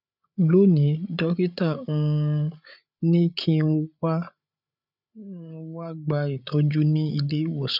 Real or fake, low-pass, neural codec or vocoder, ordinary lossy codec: fake; 5.4 kHz; codec, 16 kHz, 8 kbps, FreqCodec, larger model; none